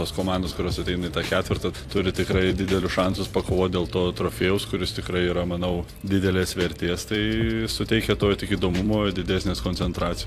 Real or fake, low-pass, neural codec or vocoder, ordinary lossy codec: fake; 14.4 kHz; vocoder, 44.1 kHz, 128 mel bands every 256 samples, BigVGAN v2; AAC, 64 kbps